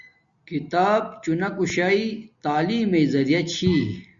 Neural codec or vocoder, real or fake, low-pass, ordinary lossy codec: none; real; 7.2 kHz; Opus, 64 kbps